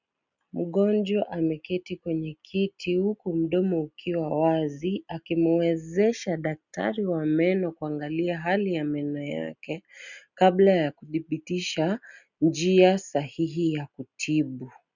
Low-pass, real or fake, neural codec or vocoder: 7.2 kHz; real; none